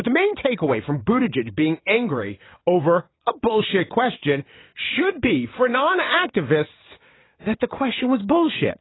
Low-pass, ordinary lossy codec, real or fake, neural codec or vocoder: 7.2 kHz; AAC, 16 kbps; fake; vocoder, 22.05 kHz, 80 mel bands, WaveNeXt